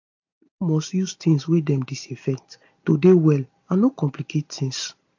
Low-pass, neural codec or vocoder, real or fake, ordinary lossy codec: 7.2 kHz; none; real; AAC, 48 kbps